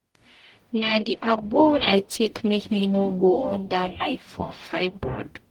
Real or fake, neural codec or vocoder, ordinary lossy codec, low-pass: fake; codec, 44.1 kHz, 0.9 kbps, DAC; Opus, 24 kbps; 19.8 kHz